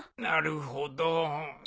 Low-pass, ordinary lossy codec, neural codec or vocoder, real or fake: none; none; none; real